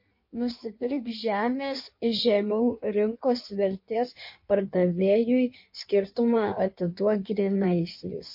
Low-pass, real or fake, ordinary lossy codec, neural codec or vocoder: 5.4 kHz; fake; MP3, 32 kbps; codec, 16 kHz in and 24 kHz out, 1.1 kbps, FireRedTTS-2 codec